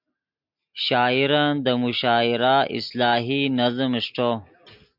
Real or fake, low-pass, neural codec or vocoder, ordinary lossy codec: real; 5.4 kHz; none; MP3, 48 kbps